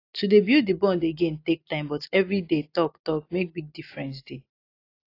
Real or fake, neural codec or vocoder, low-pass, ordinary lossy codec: real; none; 5.4 kHz; AAC, 32 kbps